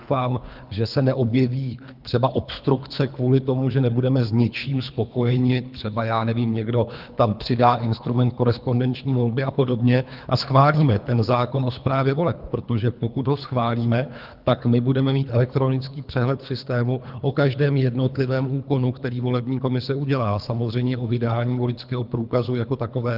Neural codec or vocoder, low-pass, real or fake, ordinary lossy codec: codec, 24 kHz, 3 kbps, HILCodec; 5.4 kHz; fake; Opus, 24 kbps